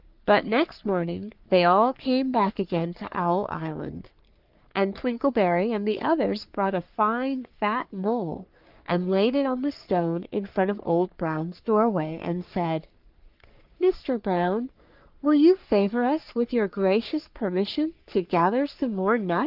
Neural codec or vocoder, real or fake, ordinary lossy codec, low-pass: codec, 44.1 kHz, 3.4 kbps, Pupu-Codec; fake; Opus, 32 kbps; 5.4 kHz